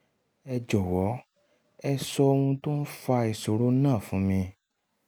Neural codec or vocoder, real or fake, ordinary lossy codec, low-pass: none; real; none; none